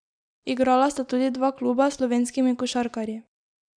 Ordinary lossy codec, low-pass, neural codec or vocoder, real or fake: none; 9.9 kHz; none; real